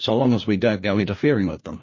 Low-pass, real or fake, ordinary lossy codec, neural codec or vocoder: 7.2 kHz; fake; MP3, 32 kbps; codec, 16 kHz, 1 kbps, FunCodec, trained on LibriTTS, 50 frames a second